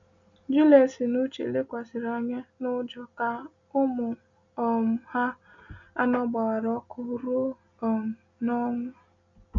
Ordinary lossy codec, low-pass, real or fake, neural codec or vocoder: none; 7.2 kHz; real; none